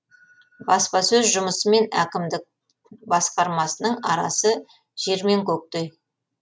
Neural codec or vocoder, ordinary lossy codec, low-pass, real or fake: none; none; none; real